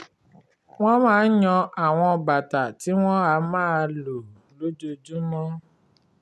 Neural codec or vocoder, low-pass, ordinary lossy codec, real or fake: none; none; none; real